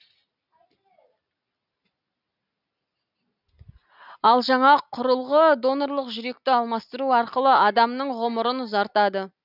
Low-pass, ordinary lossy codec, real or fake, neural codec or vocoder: 5.4 kHz; none; real; none